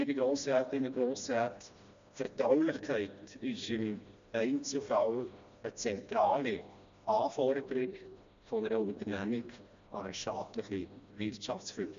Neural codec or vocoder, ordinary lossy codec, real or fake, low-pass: codec, 16 kHz, 1 kbps, FreqCodec, smaller model; MP3, 64 kbps; fake; 7.2 kHz